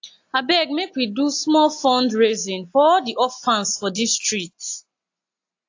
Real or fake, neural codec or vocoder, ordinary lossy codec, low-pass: real; none; AAC, 48 kbps; 7.2 kHz